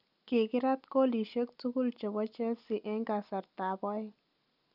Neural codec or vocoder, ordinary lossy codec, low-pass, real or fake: none; none; 5.4 kHz; real